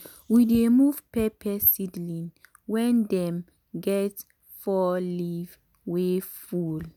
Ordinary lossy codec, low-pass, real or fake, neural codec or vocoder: none; 19.8 kHz; real; none